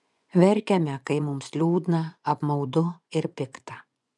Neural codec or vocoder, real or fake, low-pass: vocoder, 24 kHz, 100 mel bands, Vocos; fake; 10.8 kHz